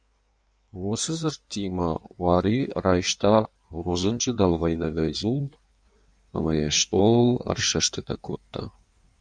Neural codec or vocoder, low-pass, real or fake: codec, 16 kHz in and 24 kHz out, 1.1 kbps, FireRedTTS-2 codec; 9.9 kHz; fake